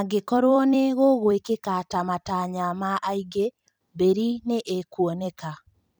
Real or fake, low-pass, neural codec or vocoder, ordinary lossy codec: fake; none; vocoder, 44.1 kHz, 128 mel bands every 256 samples, BigVGAN v2; none